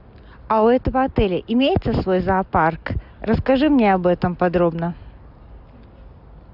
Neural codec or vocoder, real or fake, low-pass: none; real; 5.4 kHz